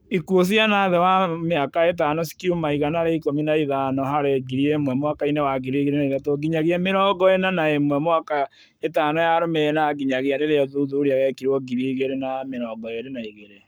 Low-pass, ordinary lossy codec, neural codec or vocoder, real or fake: none; none; codec, 44.1 kHz, 7.8 kbps, Pupu-Codec; fake